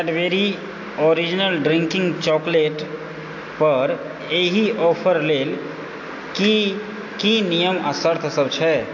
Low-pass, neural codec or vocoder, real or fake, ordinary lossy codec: 7.2 kHz; none; real; AAC, 48 kbps